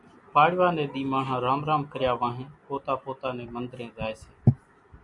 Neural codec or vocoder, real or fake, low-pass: none; real; 10.8 kHz